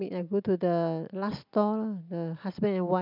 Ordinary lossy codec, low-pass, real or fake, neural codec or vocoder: none; 5.4 kHz; real; none